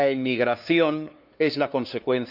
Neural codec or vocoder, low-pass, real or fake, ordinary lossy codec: codec, 16 kHz, 2 kbps, FunCodec, trained on LibriTTS, 25 frames a second; 5.4 kHz; fake; none